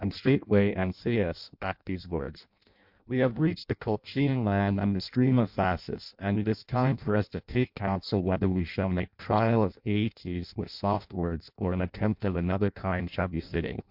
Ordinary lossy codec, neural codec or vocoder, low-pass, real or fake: MP3, 48 kbps; codec, 16 kHz in and 24 kHz out, 0.6 kbps, FireRedTTS-2 codec; 5.4 kHz; fake